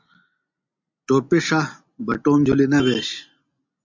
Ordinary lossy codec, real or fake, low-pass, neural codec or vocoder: MP3, 64 kbps; real; 7.2 kHz; none